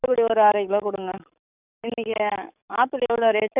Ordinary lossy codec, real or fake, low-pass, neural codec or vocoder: none; fake; 3.6 kHz; codec, 44.1 kHz, 7.8 kbps, DAC